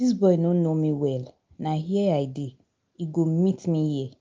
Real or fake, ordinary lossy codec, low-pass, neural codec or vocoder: real; Opus, 24 kbps; 7.2 kHz; none